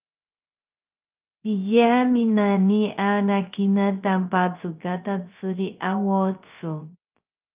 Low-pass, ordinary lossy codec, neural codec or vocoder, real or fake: 3.6 kHz; Opus, 24 kbps; codec, 16 kHz, 0.3 kbps, FocalCodec; fake